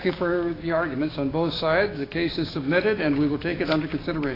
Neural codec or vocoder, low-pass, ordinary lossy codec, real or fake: codec, 16 kHz, 6 kbps, DAC; 5.4 kHz; AAC, 24 kbps; fake